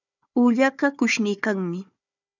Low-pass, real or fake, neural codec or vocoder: 7.2 kHz; fake; codec, 16 kHz, 4 kbps, FunCodec, trained on Chinese and English, 50 frames a second